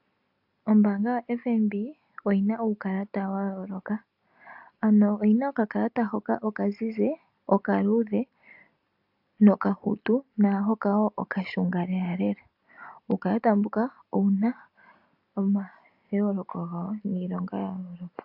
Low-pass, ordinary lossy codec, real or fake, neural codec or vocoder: 5.4 kHz; AAC, 48 kbps; real; none